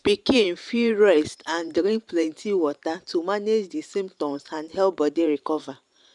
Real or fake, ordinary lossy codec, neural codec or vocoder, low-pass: fake; none; vocoder, 44.1 kHz, 128 mel bands every 512 samples, BigVGAN v2; 10.8 kHz